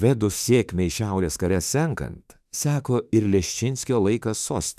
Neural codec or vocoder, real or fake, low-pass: autoencoder, 48 kHz, 32 numbers a frame, DAC-VAE, trained on Japanese speech; fake; 14.4 kHz